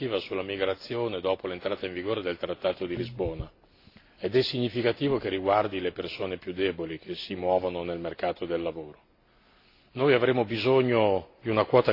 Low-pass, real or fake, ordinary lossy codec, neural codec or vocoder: 5.4 kHz; real; AAC, 32 kbps; none